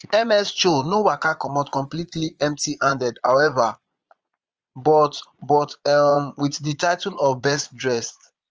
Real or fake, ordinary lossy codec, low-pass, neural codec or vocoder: fake; Opus, 24 kbps; 7.2 kHz; vocoder, 22.05 kHz, 80 mel bands, Vocos